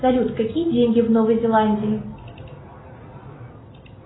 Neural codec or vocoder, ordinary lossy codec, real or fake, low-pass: none; AAC, 16 kbps; real; 7.2 kHz